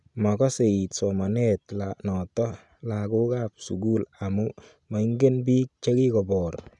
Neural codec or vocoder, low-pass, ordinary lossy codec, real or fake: none; 10.8 kHz; none; real